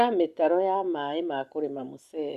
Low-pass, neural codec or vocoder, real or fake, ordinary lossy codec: 14.4 kHz; none; real; Opus, 64 kbps